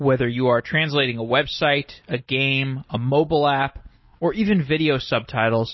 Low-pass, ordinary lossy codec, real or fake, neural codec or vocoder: 7.2 kHz; MP3, 24 kbps; real; none